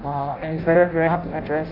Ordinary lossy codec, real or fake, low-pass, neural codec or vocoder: none; fake; 5.4 kHz; codec, 16 kHz in and 24 kHz out, 0.6 kbps, FireRedTTS-2 codec